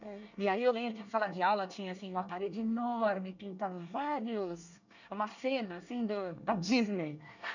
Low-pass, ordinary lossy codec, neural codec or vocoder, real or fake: 7.2 kHz; none; codec, 24 kHz, 1 kbps, SNAC; fake